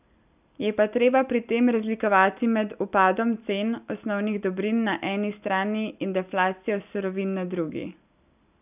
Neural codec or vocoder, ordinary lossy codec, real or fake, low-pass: none; none; real; 3.6 kHz